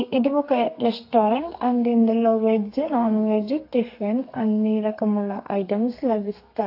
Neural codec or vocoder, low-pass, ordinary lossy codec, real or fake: codec, 32 kHz, 1.9 kbps, SNAC; 5.4 kHz; AAC, 32 kbps; fake